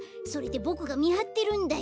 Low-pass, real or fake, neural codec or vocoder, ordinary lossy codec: none; real; none; none